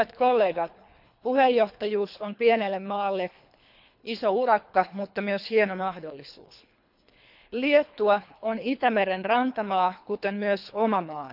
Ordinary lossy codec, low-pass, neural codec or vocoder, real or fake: none; 5.4 kHz; codec, 24 kHz, 3 kbps, HILCodec; fake